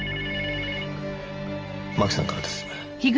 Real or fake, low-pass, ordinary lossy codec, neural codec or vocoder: real; 7.2 kHz; Opus, 24 kbps; none